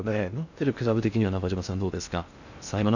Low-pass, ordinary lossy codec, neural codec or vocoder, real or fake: 7.2 kHz; none; codec, 16 kHz in and 24 kHz out, 0.6 kbps, FocalCodec, streaming, 2048 codes; fake